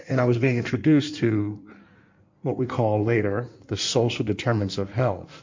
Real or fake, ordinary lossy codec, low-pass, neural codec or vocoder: fake; MP3, 48 kbps; 7.2 kHz; codec, 16 kHz in and 24 kHz out, 1.1 kbps, FireRedTTS-2 codec